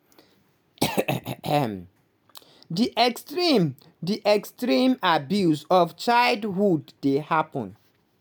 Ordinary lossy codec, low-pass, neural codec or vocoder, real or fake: none; none; none; real